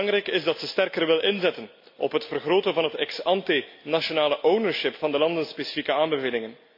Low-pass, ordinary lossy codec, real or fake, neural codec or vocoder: 5.4 kHz; MP3, 48 kbps; real; none